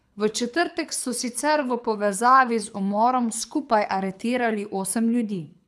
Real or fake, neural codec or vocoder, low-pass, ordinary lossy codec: fake; codec, 24 kHz, 6 kbps, HILCodec; none; none